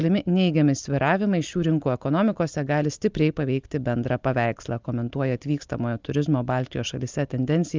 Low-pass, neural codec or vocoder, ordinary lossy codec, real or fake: 7.2 kHz; none; Opus, 24 kbps; real